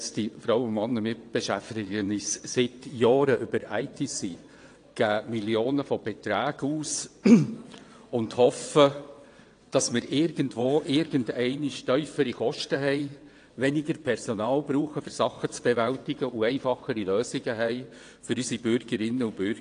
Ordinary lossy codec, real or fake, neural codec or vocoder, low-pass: AAC, 48 kbps; fake; vocoder, 22.05 kHz, 80 mel bands, WaveNeXt; 9.9 kHz